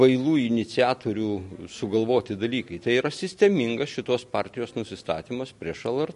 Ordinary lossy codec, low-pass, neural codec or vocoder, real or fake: MP3, 48 kbps; 14.4 kHz; none; real